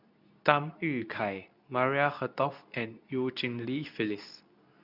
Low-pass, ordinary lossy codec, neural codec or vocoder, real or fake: 5.4 kHz; none; codec, 24 kHz, 0.9 kbps, WavTokenizer, medium speech release version 2; fake